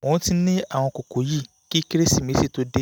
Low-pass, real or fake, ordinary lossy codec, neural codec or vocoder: none; real; none; none